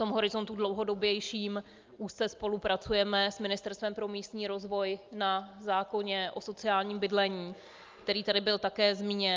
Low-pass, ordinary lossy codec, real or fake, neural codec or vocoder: 7.2 kHz; Opus, 24 kbps; real; none